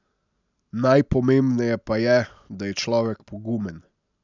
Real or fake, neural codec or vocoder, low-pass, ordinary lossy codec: real; none; 7.2 kHz; none